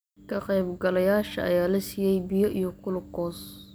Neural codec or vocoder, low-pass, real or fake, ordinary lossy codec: none; none; real; none